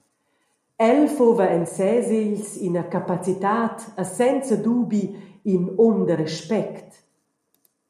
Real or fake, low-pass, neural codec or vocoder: real; 14.4 kHz; none